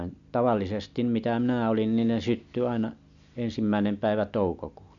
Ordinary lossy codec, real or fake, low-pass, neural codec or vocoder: none; real; 7.2 kHz; none